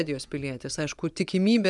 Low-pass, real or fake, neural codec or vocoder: 10.8 kHz; fake; vocoder, 24 kHz, 100 mel bands, Vocos